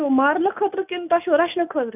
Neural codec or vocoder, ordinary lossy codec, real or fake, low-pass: none; none; real; 3.6 kHz